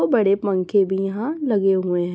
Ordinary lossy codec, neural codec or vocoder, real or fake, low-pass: none; none; real; none